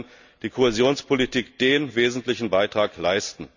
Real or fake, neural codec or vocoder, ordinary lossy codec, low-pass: real; none; none; 7.2 kHz